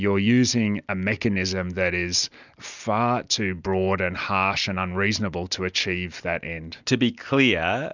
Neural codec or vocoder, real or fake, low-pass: none; real; 7.2 kHz